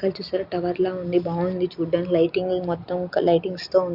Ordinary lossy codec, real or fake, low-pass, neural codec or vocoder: Opus, 64 kbps; real; 5.4 kHz; none